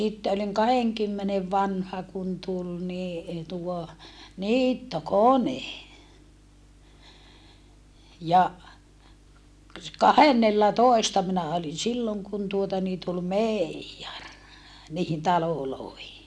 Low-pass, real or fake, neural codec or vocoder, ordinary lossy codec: none; real; none; none